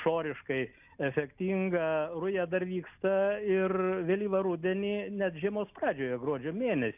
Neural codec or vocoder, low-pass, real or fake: none; 3.6 kHz; real